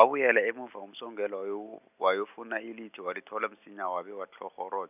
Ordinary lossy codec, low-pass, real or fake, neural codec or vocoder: none; 3.6 kHz; real; none